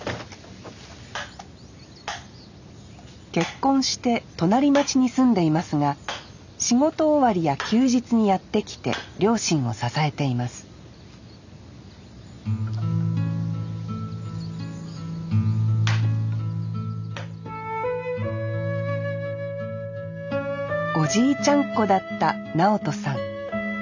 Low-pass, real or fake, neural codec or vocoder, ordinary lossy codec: 7.2 kHz; real; none; none